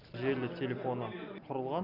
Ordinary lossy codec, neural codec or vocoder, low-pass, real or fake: none; none; 5.4 kHz; real